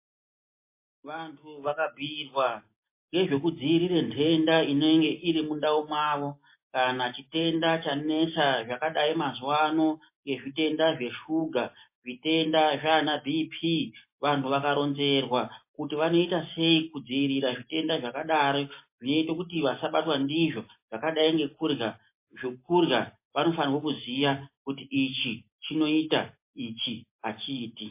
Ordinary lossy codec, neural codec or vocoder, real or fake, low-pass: MP3, 24 kbps; none; real; 3.6 kHz